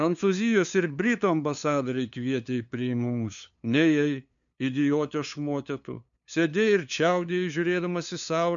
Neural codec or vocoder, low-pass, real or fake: codec, 16 kHz, 2 kbps, FunCodec, trained on LibriTTS, 25 frames a second; 7.2 kHz; fake